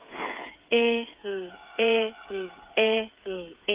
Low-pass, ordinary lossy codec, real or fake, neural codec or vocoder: 3.6 kHz; Opus, 32 kbps; fake; codec, 16 kHz, 8 kbps, FreqCodec, smaller model